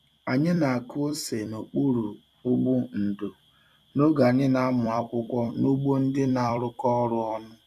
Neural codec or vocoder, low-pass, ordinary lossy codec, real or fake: vocoder, 44.1 kHz, 128 mel bands every 256 samples, BigVGAN v2; 14.4 kHz; none; fake